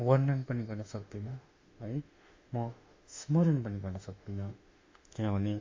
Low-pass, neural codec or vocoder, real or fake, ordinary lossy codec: 7.2 kHz; autoencoder, 48 kHz, 32 numbers a frame, DAC-VAE, trained on Japanese speech; fake; MP3, 48 kbps